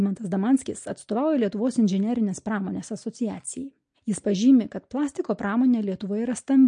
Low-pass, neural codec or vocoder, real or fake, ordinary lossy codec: 9.9 kHz; none; real; MP3, 48 kbps